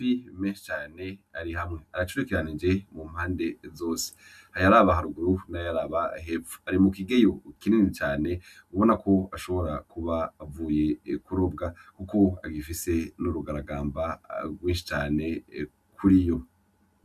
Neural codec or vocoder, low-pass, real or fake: none; 14.4 kHz; real